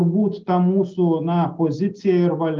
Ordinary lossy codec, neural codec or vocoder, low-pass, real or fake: Opus, 32 kbps; none; 7.2 kHz; real